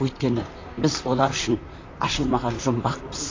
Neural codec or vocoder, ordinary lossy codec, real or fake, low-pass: vocoder, 44.1 kHz, 128 mel bands, Pupu-Vocoder; AAC, 32 kbps; fake; 7.2 kHz